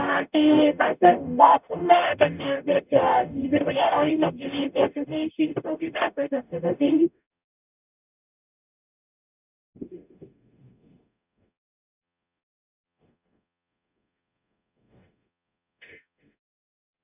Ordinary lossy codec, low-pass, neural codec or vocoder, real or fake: none; 3.6 kHz; codec, 44.1 kHz, 0.9 kbps, DAC; fake